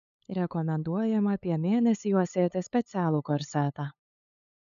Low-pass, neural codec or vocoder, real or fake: 7.2 kHz; codec, 16 kHz, 8 kbps, FunCodec, trained on LibriTTS, 25 frames a second; fake